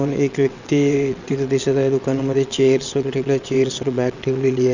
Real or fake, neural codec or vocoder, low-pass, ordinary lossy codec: fake; vocoder, 22.05 kHz, 80 mel bands, WaveNeXt; 7.2 kHz; none